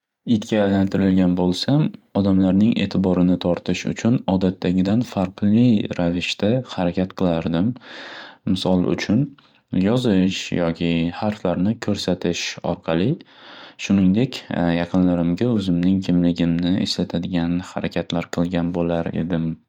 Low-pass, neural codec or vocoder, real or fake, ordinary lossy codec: 19.8 kHz; vocoder, 44.1 kHz, 128 mel bands every 512 samples, BigVGAN v2; fake; MP3, 96 kbps